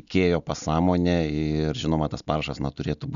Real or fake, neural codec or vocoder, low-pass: real; none; 7.2 kHz